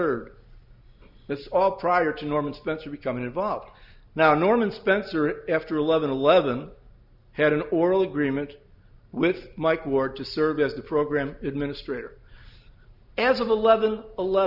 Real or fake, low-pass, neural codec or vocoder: real; 5.4 kHz; none